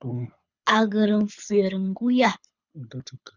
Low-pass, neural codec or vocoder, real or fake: 7.2 kHz; codec, 24 kHz, 6 kbps, HILCodec; fake